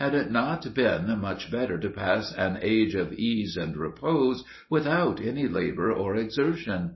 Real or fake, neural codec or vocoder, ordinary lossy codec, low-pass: real; none; MP3, 24 kbps; 7.2 kHz